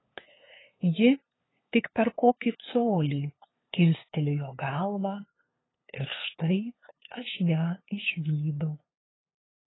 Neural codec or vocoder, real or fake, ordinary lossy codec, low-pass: codec, 16 kHz, 2 kbps, FunCodec, trained on LibriTTS, 25 frames a second; fake; AAC, 16 kbps; 7.2 kHz